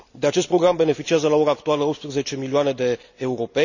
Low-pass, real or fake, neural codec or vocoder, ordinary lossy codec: 7.2 kHz; real; none; none